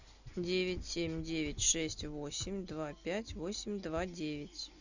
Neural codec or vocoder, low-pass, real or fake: none; 7.2 kHz; real